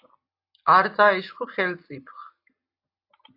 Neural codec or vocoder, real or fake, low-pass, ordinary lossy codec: none; real; 5.4 kHz; AAC, 32 kbps